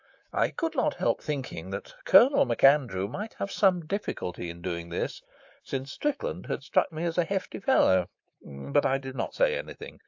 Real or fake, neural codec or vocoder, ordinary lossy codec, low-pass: fake; codec, 24 kHz, 3.1 kbps, DualCodec; AAC, 48 kbps; 7.2 kHz